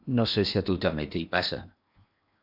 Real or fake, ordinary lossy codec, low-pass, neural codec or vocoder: fake; AAC, 48 kbps; 5.4 kHz; codec, 16 kHz in and 24 kHz out, 0.8 kbps, FocalCodec, streaming, 65536 codes